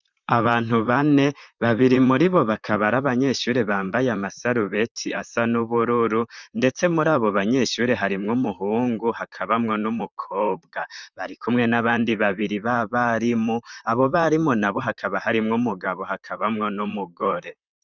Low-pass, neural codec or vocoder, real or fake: 7.2 kHz; vocoder, 44.1 kHz, 128 mel bands, Pupu-Vocoder; fake